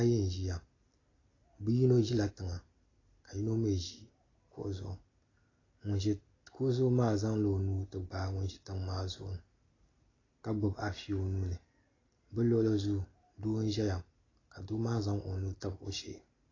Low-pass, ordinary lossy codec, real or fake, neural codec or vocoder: 7.2 kHz; AAC, 32 kbps; real; none